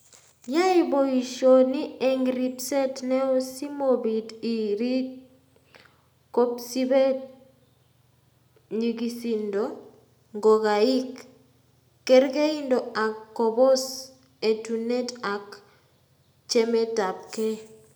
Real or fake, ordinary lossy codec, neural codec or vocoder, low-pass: real; none; none; none